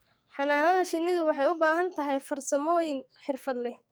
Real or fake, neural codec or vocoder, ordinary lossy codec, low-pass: fake; codec, 44.1 kHz, 2.6 kbps, SNAC; none; none